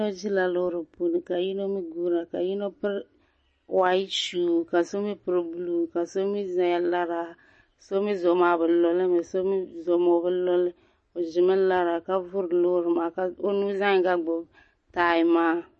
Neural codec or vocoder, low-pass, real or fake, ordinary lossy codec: none; 10.8 kHz; real; MP3, 32 kbps